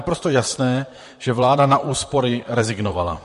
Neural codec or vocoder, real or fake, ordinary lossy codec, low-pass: vocoder, 44.1 kHz, 128 mel bands, Pupu-Vocoder; fake; MP3, 48 kbps; 14.4 kHz